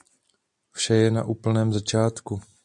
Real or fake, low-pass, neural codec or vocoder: real; 10.8 kHz; none